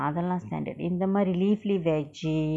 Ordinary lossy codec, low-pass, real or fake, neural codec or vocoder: none; none; real; none